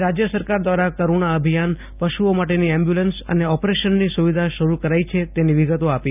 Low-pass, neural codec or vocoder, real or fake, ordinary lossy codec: 3.6 kHz; none; real; none